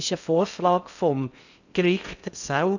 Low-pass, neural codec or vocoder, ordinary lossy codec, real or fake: 7.2 kHz; codec, 16 kHz in and 24 kHz out, 0.6 kbps, FocalCodec, streaming, 4096 codes; none; fake